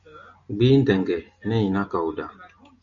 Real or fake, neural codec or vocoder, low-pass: real; none; 7.2 kHz